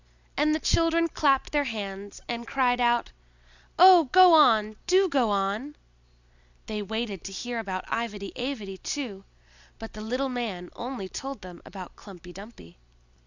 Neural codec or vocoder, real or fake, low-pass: none; real; 7.2 kHz